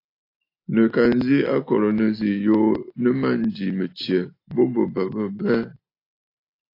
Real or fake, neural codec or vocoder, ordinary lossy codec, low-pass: real; none; AAC, 32 kbps; 5.4 kHz